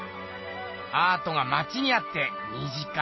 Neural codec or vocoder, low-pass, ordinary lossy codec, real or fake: none; 7.2 kHz; MP3, 24 kbps; real